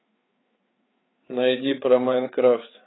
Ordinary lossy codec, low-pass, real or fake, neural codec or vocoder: AAC, 16 kbps; 7.2 kHz; fake; codec, 16 kHz, 4 kbps, FreqCodec, larger model